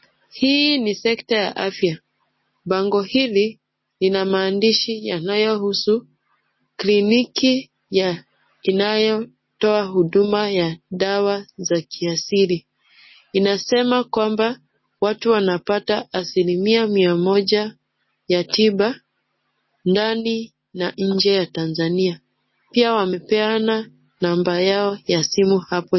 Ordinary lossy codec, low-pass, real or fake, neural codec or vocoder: MP3, 24 kbps; 7.2 kHz; real; none